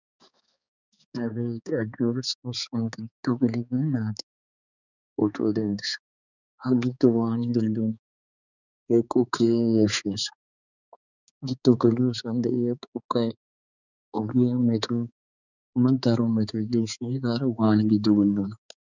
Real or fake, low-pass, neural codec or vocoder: fake; 7.2 kHz; codec, 16 kHz, 4 kbps, X-Codec, HuBERT features, trained on balanced general audio